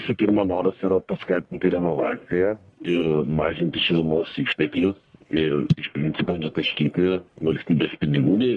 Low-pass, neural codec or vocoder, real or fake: 10.8 kHz; codec, 44.1 kHz, 1.7 kbps, Pupu-Codec; fake